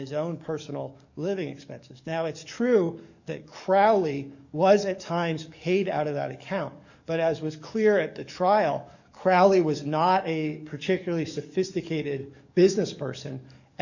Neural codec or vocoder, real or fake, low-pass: codec, 44.1 kHz, 7.8 kbps, DAC; fake; 7.2 kHz